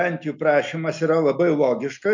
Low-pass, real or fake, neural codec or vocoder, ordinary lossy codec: 7.2 kHz; real; none; MP3, 48 kbps